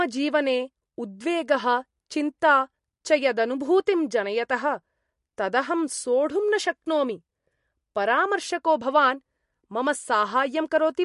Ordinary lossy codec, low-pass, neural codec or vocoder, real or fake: MP3, 48 kbps; 14.4 kHz; none; real